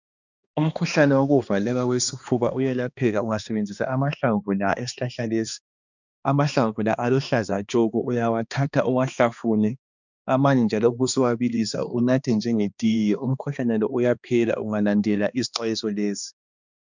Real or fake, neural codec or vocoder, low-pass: fake; codec, 16 kHz, 2 kbps, X-Codec, HuBERT features, trained on balanced general audio; 7.2 kHz